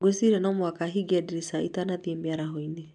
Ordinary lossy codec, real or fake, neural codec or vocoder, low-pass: none; real; none; 10.8 kHz